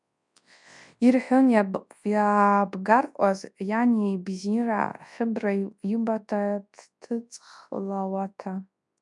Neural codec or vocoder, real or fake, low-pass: codec, 24 kHz, 0.9 kbps, WavTokenizer, large speech release; fake; 10.8 kHz